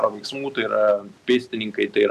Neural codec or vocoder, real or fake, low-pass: none; real; 14.4 kHz